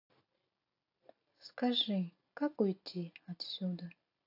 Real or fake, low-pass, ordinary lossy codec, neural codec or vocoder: real; 5.4 kHz; AAC, 32 kbps; none